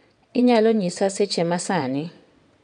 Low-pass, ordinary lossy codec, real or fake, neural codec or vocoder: 9.9 kHz; none; fake; vocoder, 22.05 kHz, 80 mel bands, WaveNeXt